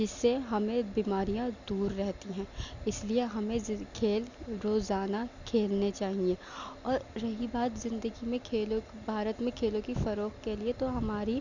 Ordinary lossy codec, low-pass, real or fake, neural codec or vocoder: none; 7.2 kHz; real; none